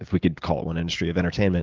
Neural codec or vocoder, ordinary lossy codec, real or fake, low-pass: none; Opus, 32 kbps; real; 7.2 kHz